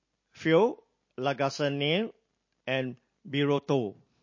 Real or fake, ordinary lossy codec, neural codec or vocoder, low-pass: real; MP3, 32 kbps; none; 7.2 kHz